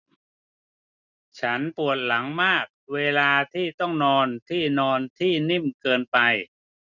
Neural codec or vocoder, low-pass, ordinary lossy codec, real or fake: none; 7.2 kHz; none; real